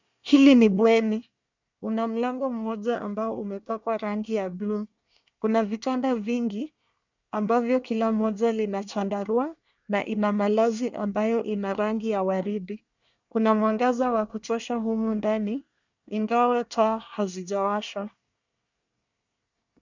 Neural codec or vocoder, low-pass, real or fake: codec, 24 kHz, 1 kbps, SNAC; 7.2 kHz; fake